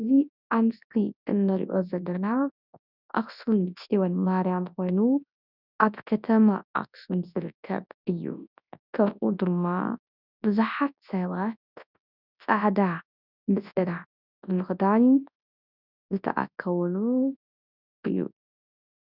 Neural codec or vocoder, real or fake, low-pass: codec, 24 kHz, 0.9 kbps, WavTokenizer, large speech release; fake; 5.4 kHz